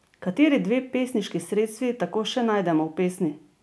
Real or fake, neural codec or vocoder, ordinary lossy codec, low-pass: real; none; none; none